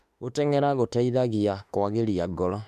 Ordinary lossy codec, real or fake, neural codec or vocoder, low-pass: MP3, 96 kbps; fake; autoencoder, 48 kHz, 32 numbers a frame, DAC-VAE, trained on Japanese speech; 14.4 kHz